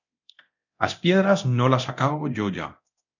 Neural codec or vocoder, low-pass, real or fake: codec, 24 kHz, 0.9 kbps, DualCodec; 7.2 kHz; fake